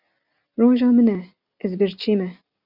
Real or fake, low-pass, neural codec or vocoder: real; 5.4 kHz; none